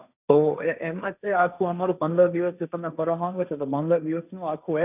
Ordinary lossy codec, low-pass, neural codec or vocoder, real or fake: none; 3.6 kHz; codec, 16 kHz, 1.1 kbps, Voila-Tokenizer; fake